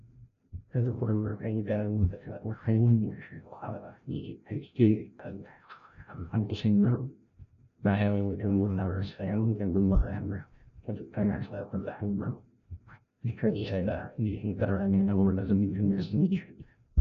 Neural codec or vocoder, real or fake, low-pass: codec, 16 kHz, 0.5 kbps, FreqCodec, larger model; fake; 7.2 kHz